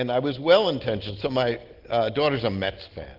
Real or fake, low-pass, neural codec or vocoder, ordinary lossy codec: real; 5.4 kHz; none; Opus, 16 kbps